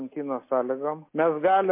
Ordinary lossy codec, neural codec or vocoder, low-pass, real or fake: MP3, 24 kbps; none; 3.6 kHz; real